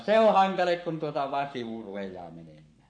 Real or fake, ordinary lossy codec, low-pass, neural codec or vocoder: fake; none; 9.9 kHz; codec, 44.1 kHz, 7.8 kbps, Pupu-Codec